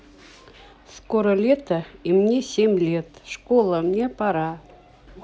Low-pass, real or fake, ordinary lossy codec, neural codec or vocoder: none; real; none; none